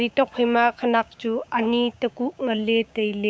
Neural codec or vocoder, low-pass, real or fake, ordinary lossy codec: codec, 16 kHz, 6 kbps, DAC; none; fake; none